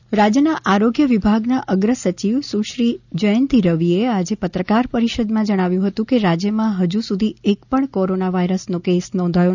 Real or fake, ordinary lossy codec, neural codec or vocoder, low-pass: real; none; none; 7.2 kHz